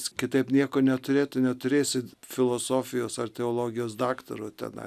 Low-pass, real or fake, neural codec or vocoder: 14.4 kHz; real; none